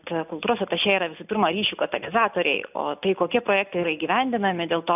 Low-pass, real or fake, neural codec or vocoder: 3.6 kHz; real; none